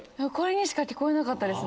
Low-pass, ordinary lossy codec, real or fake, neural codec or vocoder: none; none; real; none